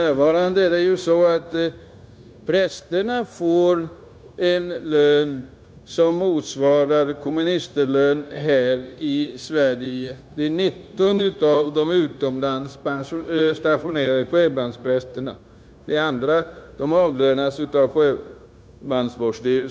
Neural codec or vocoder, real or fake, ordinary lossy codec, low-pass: codec, 16 kHz, 0.9 kbps, LongCat-Audio-Codec; fake; none; none